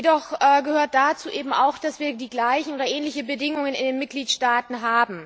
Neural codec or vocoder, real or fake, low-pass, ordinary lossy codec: none; real; none; none